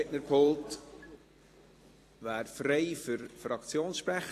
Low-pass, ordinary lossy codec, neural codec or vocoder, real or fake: 14.4 kHz; AAC, 48 kbps; vocoder, 44.1 kHz, 128 mel bands every 256 samples, BigVGAN v2; fake